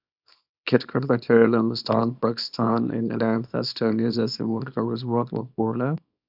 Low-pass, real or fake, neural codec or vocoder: 5.4 kHz; fake; codec, 24 kHz, 0.9 kbps, WavTokenizer, small release